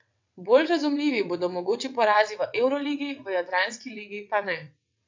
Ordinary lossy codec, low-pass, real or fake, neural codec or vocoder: MP3, 64 kbps; 7.2 kHz; fake; vocoder, 22.05 kHz, 80 mel bands, WaveNeXt